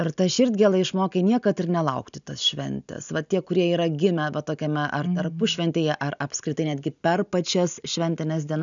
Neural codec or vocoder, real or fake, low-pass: none; real; 7.2 kHz